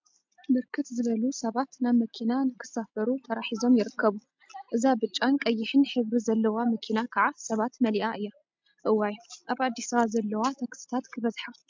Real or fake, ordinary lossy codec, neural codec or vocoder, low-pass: real; MP3, 64 kbps; none; 7.2 kHz